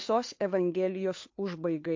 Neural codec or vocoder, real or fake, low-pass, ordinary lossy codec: codec, 16 kHz, 2 kbps, FunCodec, trained on Chinese and English, 25 frames a second; fake; 7.2 kHz; MP3, 48 kbps